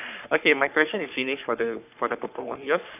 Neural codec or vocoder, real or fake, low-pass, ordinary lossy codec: codec, 44.1 kHz, 3.4 kbps, Pupu-Codec; fake; 3.6 kHz; none